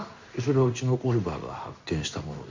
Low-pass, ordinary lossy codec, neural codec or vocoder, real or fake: 7.2 kHz; MP3, 64 kbps; codec, 16 kHz in and 24 kHz out, 1 kbps, XY-Tokenizer; fake